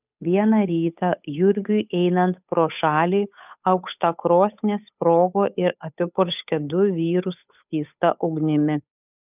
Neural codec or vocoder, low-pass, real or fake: codec, 16 kHz, 8 kbps, FunCodec, trained on Chinese and English, 25 frames a second; 3.6 kHz; fake